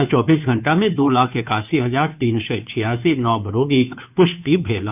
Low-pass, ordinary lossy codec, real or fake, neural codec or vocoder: 3.6 kHz; none; fake; codec, 16 kHz, 2 kbps, FunCodec, trained on Chinese and English, 25 frames a second